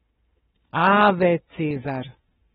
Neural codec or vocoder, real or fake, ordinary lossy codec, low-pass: codec, 16 kHz, 4 kbps, FunCodec, trained on Chinese and English, 50 frames a second; fake; AAC, 16 kbps; 7.2 kHz